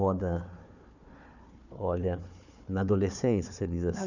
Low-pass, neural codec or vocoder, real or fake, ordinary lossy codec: 7.2 kHz; codec, 16 kHz, 4 kbps, FunCodec, trained on Chinese and English, 50 frames a second; fake; none